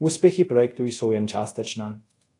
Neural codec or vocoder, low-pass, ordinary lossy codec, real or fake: codec, 24 kHz, 0.5 kbps, DualCodec; 10.8 kHz; AAC, 48 kbps; fake